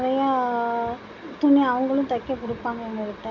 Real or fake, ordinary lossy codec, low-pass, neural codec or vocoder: real; none; 7.2 kHz; none